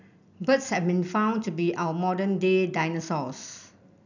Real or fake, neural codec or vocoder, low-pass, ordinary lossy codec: real; none; 7.2 kHz; none